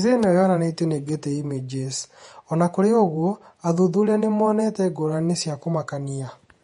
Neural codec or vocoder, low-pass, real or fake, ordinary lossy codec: vocoder, 48 kHz, 128 mel bands, Vocos; 19.8 kHz; fake; MP3, 48 kbps